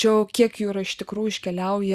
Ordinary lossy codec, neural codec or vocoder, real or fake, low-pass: Opus, 64 kbps; none; real; 14.4 kHz